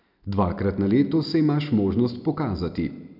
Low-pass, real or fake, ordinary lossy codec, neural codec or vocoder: 5.4 kHz; real; none; none